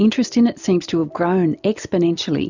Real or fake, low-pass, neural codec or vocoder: real; 7.2 kHz; none